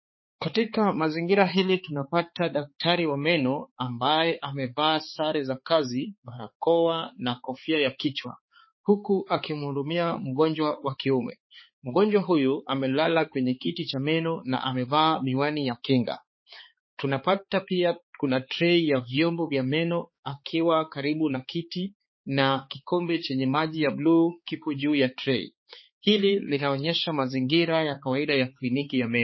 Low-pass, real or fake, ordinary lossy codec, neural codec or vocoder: 7.2 kHz; fake; MP3, 24 kbps; codec, 16 kHz, 4 kbps, X-Codec, HuBERT features, trained on balanced general audio